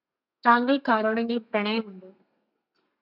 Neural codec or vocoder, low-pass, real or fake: codec, 32 kHz, 1.9 kbps, SNAC; 5.4 kHz; fake